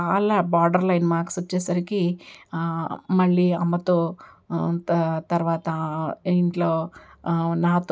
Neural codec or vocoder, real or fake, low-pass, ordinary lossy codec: none; real; none; none